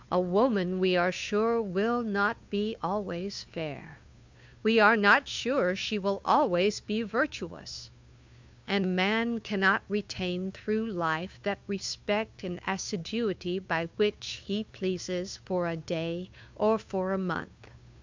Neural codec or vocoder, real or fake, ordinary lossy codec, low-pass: codec, 16 kHz, 2 kbps, FunCodec, trained on Chinese and English, 25 frames a second; fake; MP3, 64 kbps; 7.2 kHz